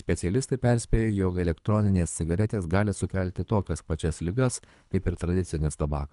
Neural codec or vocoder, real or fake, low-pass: codec, 24 kHz, 3 kbps, HILCodec; fake; 10.8 kHz